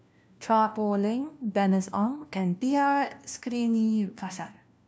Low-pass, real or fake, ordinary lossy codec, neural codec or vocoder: none; fake; none; codec, 16 kHz, 1 kbps, FunCodec, trained on LibriTTS, 50 frames a second